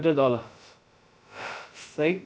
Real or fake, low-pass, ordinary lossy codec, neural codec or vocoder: fake; none; none; codec, 16 kHz, about 1 kbps, DyCAST, with the encoder's durations